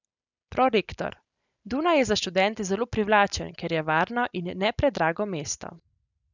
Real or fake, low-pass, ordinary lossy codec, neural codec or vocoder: real; 7.2 kHz; none; none